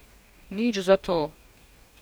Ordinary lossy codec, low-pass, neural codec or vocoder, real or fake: none; none; codec, 44.1 kHz, 2.6 kbps, DAC; fake